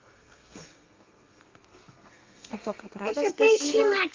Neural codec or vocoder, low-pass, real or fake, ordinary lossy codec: codec, 32 kHz, 1.9 kbps, SNAC; 7.2 kHz; fake; Opus, 24 kbps